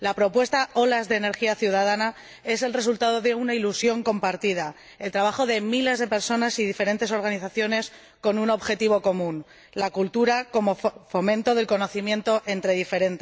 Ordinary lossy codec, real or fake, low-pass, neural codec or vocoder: none; real; none; none